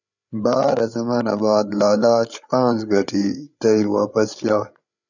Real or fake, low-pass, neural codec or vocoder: fake; 7.2 kHz; codec, 16 kHz, 8 kbps, FreqCodec, larger model